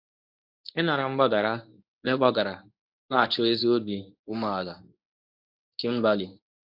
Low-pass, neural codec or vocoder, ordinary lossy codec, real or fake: 5.4 kHz; codec, 24 kHz, 0.9 kbps, WavTokenizer, medium speech release version 1; AAC, 48 kbps; fake